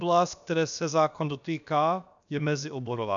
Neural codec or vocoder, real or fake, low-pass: codec, 16 kHz, about 1 kbps, DyCAST, with the encoder's durations; fake; 7.2 kHz